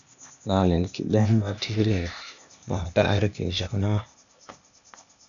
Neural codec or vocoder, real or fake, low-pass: codec, 16 kHz, 0.8 kbps, ZipCodec; fake; 7.2 kHz